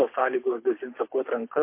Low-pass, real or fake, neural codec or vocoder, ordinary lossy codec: 3.6 kHz; fake; codec, 24 kHz, 6 kbps, HILCodec; MP3, 24 kbps